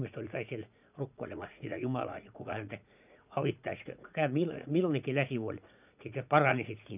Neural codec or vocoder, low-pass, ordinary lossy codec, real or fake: vocoder, 44.1 kHz, 80 mel bands, Vocos; 3.6 kHz; none; fake